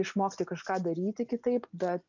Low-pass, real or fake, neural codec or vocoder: 7.2 kHz; real; none